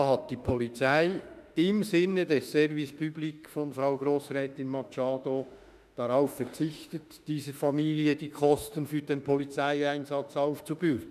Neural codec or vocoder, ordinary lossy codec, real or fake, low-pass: autoencoder, 48 kHz, 32 numbers a frame, DAC-VAE, trained on Japanese speech; none; fake; 14.4 kHz